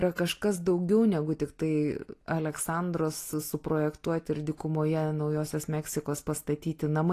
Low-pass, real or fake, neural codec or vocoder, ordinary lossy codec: 14.4 kHz; real; none; AAC, 48 kbps